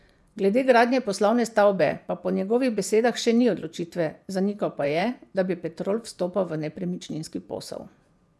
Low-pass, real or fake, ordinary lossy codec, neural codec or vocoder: none; fake; none; vocoder, 24 kHz, 100 mel bands, Vocos